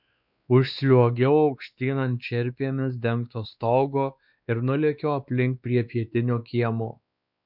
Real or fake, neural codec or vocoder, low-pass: fake; codec, 16 kHz, 2 kbps, X-Codec, WavLM features, trained on Multilingual LibriSpeech; 5.4 kHz